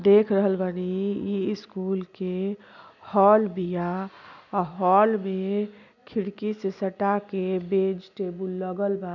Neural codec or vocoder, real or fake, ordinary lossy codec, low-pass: none; real; none; 7.2 kHz